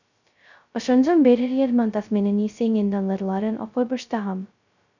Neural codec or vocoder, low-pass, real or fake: codec, 16 kHz, 0.2 kbps, FocalCodec; 7.2 kHz; fake